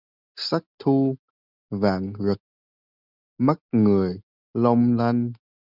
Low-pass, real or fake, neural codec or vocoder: 5.4 kHz; real; none